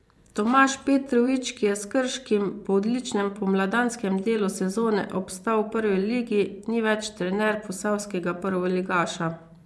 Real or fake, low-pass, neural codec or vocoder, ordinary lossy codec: fake; none; vocoder, 24 kHz, 100 mel bands, Vocos; none